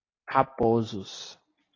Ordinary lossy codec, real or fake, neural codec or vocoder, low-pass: AAC, 32 kbps; real; none; 7.2 kHz